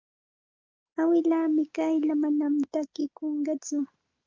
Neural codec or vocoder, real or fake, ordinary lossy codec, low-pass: none; real; Opus, 24 kbps; 7.2 kHz